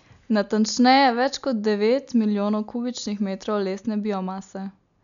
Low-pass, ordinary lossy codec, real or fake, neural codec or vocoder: 7.2 kHz; none; real; none